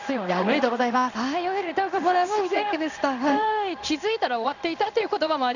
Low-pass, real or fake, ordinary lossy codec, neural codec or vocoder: 7.2 kHz; fake; none; codec, 16 kHz in and 24 kHz out, 1 kbps, XY-Tokenizer